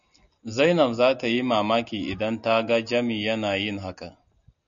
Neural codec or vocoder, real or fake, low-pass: none; real; 7.2 kHz